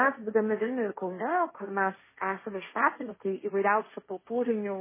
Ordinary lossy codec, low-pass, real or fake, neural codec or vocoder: MP3, 16 kbps; 3.6 kHz; fake; codec, 16 kHz, 1.1 kbps, Voila-Tokenizer